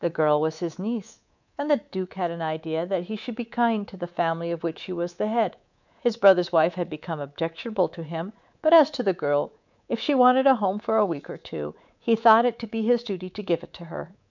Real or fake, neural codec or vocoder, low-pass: fake; codec, 24 kHz, 3.1 kbps, DualCodec; 7.2 kHz